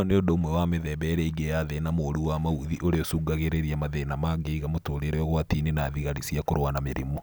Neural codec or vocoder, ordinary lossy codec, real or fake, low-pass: none; none; real; none